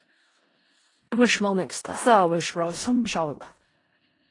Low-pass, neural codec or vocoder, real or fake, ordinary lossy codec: 10.8 kHz; codec, 16 kHz in and 24 kHz out, 0.4 kbps, LongCat-Audio-Codec, four codebook decoder; fake; AAC, 32 kbps